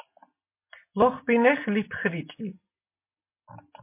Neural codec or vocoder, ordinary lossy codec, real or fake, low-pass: none; MP3, 24 kbps; real; 3.6 kHz